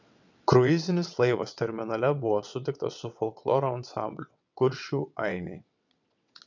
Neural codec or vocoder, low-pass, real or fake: vocoder, 22.05 kHz, 80 mel bands, WaveNeXt; 7.2 kHz; fake